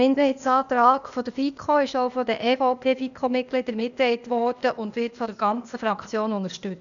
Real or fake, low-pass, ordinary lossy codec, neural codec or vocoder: fake; 7.2 kHz; none; codec, 16 kHz, 0.8 kbps, ZipCodec